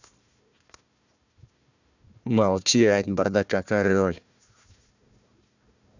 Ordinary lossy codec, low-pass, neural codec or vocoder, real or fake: none; 7.2 kHz; codec, 16 kHz, 1 kbps, FunCodec, trained on Chinese and English, 50 frames a second; fake